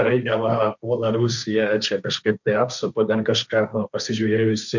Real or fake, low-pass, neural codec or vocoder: fake; 7.2 kHz; codec, 16 kHz, 1.1 kbps, Voila-Tokenizer